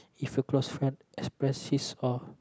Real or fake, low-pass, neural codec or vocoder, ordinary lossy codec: real; none; none; none